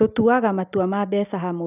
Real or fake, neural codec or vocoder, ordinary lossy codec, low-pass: real; none; none; 3.6 kHz